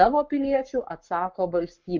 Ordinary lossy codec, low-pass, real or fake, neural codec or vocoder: Opus, 24 kbps; 7.2 kHz; fake; codec, 44.1 kHz, 7.8 kbps, Pupu-Codec